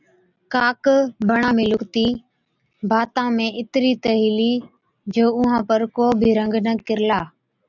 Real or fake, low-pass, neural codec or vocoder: real; 7.2 kHz; none